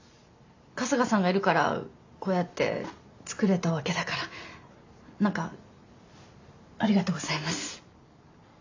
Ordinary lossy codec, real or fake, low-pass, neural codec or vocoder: AAC, 48 kbps; real; 7.2 kHz; none